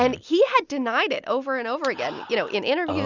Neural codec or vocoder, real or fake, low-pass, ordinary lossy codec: none; real; 7.2 kHz; Opus, 64 kbps